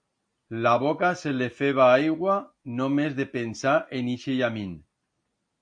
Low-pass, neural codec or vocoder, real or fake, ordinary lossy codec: 9.9 kHz; none; real; AAC, 64 kbps